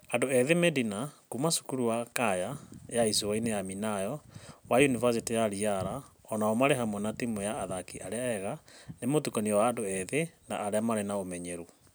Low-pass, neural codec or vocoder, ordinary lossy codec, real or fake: none; none; none; real